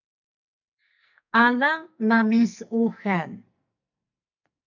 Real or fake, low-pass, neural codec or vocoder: fake; 7.2 kHz; codec, 44.1 kHz, 2.6 kbps, SNAC